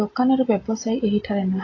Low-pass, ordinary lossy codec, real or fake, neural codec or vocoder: 7.2 kHz; AAC, 32 kbps; real; none